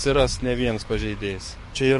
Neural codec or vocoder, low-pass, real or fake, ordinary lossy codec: codec, 44.1 kHz, 7.8 kbps, DAC; 14.4 kHz; fake; MP3, 48 kbps